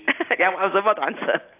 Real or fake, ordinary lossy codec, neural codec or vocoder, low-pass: real; AAC, 24 kbps; none; 3.6 kHz